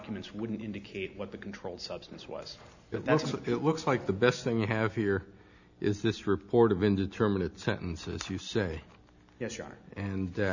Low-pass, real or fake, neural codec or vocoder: 7.2 kHz; real; none